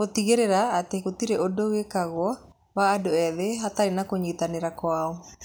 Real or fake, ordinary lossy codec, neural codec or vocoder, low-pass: real; none; none; none